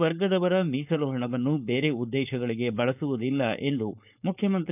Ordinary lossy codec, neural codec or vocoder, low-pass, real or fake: none; codec, 16 kHz, 4.8 kbps, FACodec; 3.6 kHz; fake